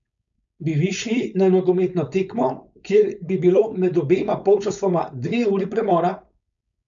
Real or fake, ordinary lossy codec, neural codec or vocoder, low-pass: fake; none; codec, 16 kHz, 4.8 kbps, FACodec; 7.2 kHz